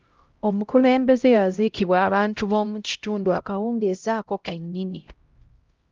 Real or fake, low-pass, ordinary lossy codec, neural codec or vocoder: fake; 7.2 kHz; Opus, 32 kbps; codec, 16 kHz, 0.5 kbps, X-Codec, HuBERT features, trained on LibriSpeech